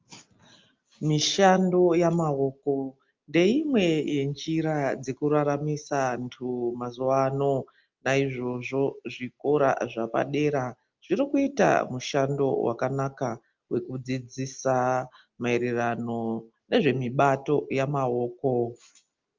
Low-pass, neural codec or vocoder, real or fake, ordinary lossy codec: 7.2 kHz; none; real; Opus, 24 kbps